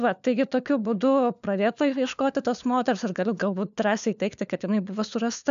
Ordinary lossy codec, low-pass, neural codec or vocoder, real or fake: AAC, 96 kbps; 7.2 kHz; codec, 16 kHz, 4 kbps, FunCodec, trained on LibriTTS, 50 frames a second; fake